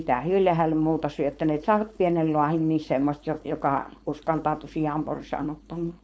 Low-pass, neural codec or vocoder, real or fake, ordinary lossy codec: none; codec, 16 kHz, 4.8 kbps, FACodec; fake; none